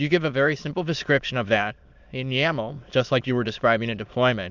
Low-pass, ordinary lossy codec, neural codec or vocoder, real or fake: 7.2 kHz; Opus, 64 kbps; autoencoder, 22.05 kHz, a latent of 192 numbers a frame, VITS, trained on many speakers; fake